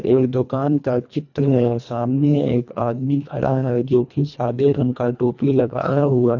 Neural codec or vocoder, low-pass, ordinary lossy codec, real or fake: codec, 24 kHz, 1.5 kbps, HILCodec; 7.2 kHz; none; fake